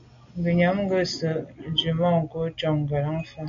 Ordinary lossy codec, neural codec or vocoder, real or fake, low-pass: MP3, 64 kbps; none; real; 7.2 kHz